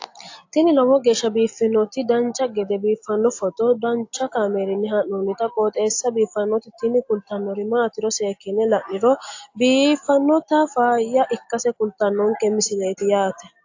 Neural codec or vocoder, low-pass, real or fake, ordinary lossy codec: none; 7.2 kHz; real; AAC, 48 kbps